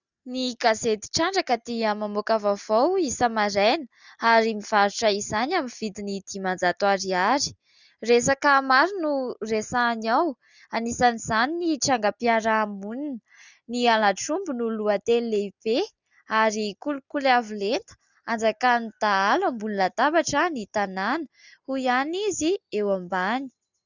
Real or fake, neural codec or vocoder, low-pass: real; none; 7.2 kHz